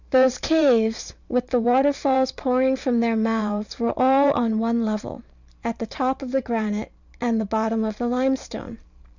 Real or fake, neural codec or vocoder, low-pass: fake; vocoder, 44.1 kHz, 128 mel bands every 512 samples, BigVGAN v2; 7.2 kHz